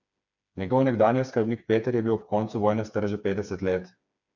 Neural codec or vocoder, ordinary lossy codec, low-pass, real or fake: codec, 16 kHz, 4 kbps, FreqCodec, smaller model; none; 7.2 kHz; fake